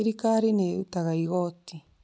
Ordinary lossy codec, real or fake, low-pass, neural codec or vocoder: none; real; none; none